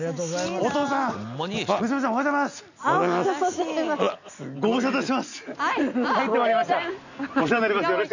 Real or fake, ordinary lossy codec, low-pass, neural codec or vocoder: real; none; 7.2 kHz; none